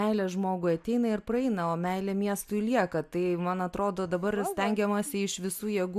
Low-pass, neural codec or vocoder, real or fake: 14.4 kHz; none; real